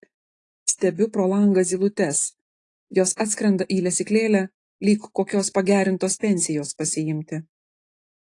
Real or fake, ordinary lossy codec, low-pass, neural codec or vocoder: real; AAC, 48 kbps; 10.8 kHz; none